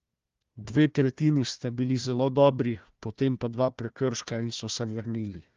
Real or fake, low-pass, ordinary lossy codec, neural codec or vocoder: fake; 7.2 kHz; Opus, 32 kbps; codec, 16 kHz, 1 kbps, FunCodec, trained on Chinese and English, 50 frames a second